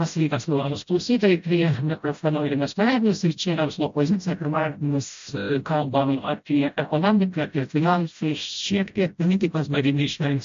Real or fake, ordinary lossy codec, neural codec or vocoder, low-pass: fake; MP3, 48 kbps; codec, 16 kHz, 0.5 kbps, FreqCodec, smaller model; 7.2 kHz